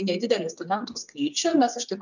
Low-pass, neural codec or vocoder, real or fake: 7.2 kHz; codec, 16 kHz, 8 kbps, FreqCodec, smaller model; fake